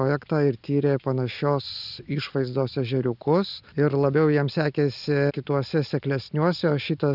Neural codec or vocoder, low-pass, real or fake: none; 5.4 kHz; real